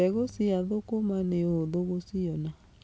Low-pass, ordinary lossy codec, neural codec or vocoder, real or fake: none; none; none; real